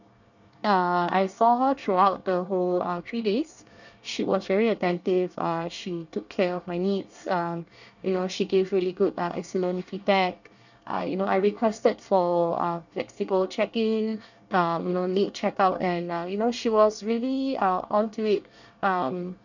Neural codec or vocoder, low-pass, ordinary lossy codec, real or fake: codec, 24 kHz, 1 kbps, SNAC; 7.2 kHz; none; fake